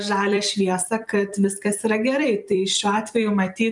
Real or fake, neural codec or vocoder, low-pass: fake; vocoder, 44.1 kHz, 128 mel bands every 256 samples, BigVGAN v2; 10.8 kHz